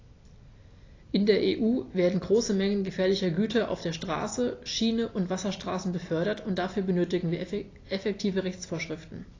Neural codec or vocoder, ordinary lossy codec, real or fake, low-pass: none; AAC, 32 kbps; real; 7.2 kHz